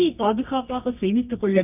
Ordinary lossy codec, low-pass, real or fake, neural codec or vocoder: none; 3.6 kHz; fake; codec, 24 kHz, 0.9 kbps, WavTokenizer, medium music audio release